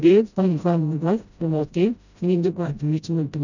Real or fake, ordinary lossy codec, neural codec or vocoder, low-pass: fake; none; codec, 16 kHz, 0.5 kbps, FreqCodec, smaller model; 7.2 kHz